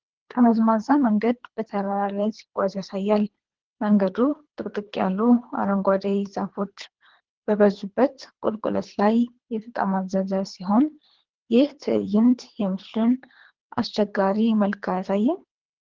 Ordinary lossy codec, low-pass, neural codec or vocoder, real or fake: Opus, 16 kbps; 7.2 kHz; codec, 24 kHz, 3 kbps, HILCodec; fake